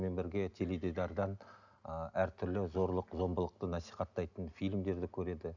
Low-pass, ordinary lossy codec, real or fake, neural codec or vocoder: 7.2 kHz; none; real; none